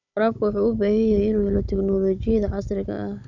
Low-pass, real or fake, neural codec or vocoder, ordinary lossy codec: 7.2 kHz; fake; codec, 16 kHz, 16 kbps, FunCodec, trained on Chinese and English, 50 frames a second; none